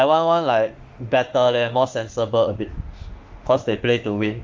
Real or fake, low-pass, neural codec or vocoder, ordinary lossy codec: fake; 7.2 kHz; autoencoder, 48 kHz, 32 numbers a frame, DAC-VAE, trained on Japanese speech; Opus, 32 kbps